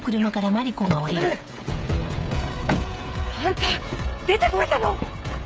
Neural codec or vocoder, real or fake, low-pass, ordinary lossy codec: codec, 16 kHz, 16 kbps, FreqCodec, smaller model; fake; none; none